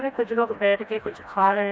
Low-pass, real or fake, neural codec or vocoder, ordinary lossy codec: none; fake; codec, 16 kHz, 1 kbps, FreqCodec, smaller model; none